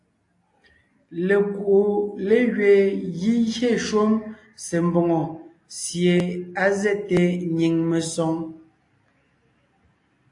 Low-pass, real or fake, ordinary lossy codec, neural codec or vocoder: 10.8 kHz; real; AAC, 48 kbps; none